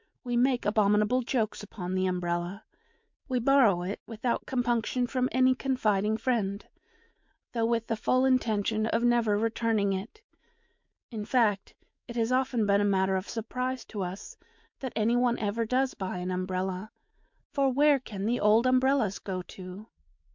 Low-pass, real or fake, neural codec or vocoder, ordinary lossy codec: 7.2 kHz; fake; autoencoder, 48 kHz, 128 numbers a frame, DAC-VAE, trained on Japanese speech; MP3, 64 kbps